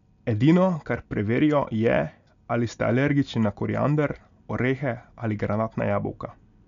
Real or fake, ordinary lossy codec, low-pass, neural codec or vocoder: real; none; 7.2 kHz; none